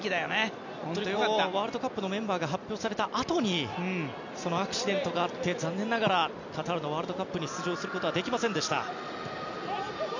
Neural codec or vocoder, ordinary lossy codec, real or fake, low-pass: none; none; real; 7.2 kHz